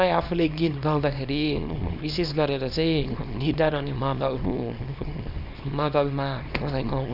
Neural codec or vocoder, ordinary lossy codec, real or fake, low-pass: codec, 24 kHz, 0.9 kbps, WavTokenizer, small release; none; fake; 5.4 kHz